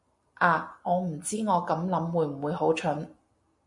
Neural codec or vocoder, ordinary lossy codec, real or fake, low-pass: none; MP3, 48 kbps; real; 10.8 kHz